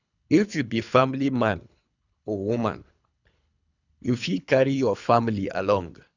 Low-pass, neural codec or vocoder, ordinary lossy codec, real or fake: 7.2 kHz; codec, 24 kHz, 3 kbps, HILCodec; none; fake